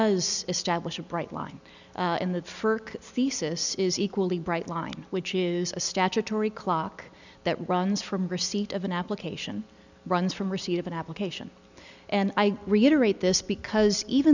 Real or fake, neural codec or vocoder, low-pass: real; none; 7.2 kHz